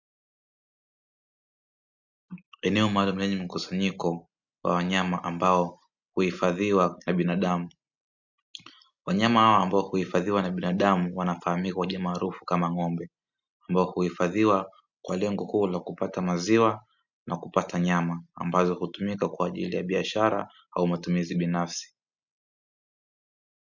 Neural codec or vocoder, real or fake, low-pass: none; real; 7.2 kHz